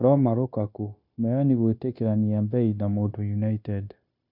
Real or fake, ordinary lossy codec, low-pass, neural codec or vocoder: fake; MP3, 48 kbps; 5.4 kHz; codec, 16 kHz, 0.9 kbps, LongCat-Audio-Codec